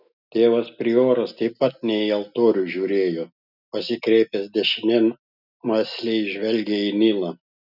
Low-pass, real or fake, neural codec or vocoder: 5.4 kHz; real; none